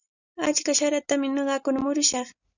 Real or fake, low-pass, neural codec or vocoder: real; 7.2 kHz; none